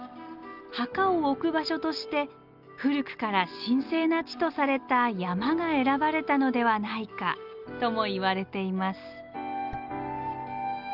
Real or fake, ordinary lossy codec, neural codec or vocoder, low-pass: real; Opus, 24 kbps; none; 5.4 kHz